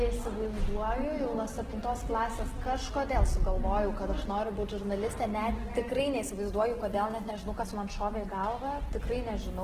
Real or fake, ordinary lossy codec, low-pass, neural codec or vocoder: real; Opus, 16 kbps; 14.4 kHz; none